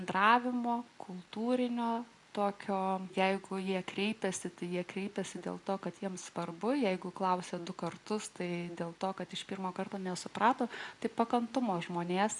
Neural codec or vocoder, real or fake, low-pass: none; real; 10.8 kHz